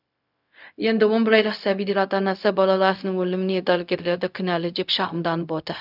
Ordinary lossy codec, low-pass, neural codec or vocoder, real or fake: none; 5.4 kHz; codec, 16 kHz, 0.4 kbps, LongCat-Audio-Codec; fake